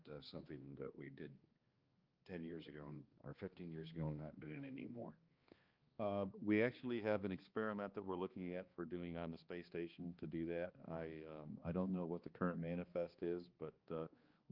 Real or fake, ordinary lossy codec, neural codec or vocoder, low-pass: fake; Opus, 24 kbps; codec, 16 kHz, 2 kbps, X-Codec, HuBERT features, trained on balanced general audio; 5.4 kHz